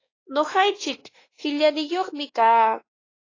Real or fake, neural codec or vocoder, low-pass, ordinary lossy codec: fake; codec, 16 kHz, 2 kbps, X-Codec, WavLM features, trained on Multilingual LibriSpeech; 7.2 kHz; AAC, 32 kbps